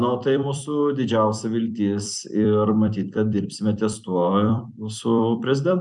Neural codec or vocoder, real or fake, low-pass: vocoder, 44.1 kHz, 128 mel bands every 256 samples, BigVGAN v2; fake; 10.8 kHz